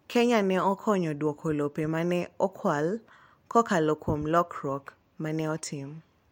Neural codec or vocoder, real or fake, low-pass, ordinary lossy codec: none; real; 19.8 kHz; MP3, 64 kbps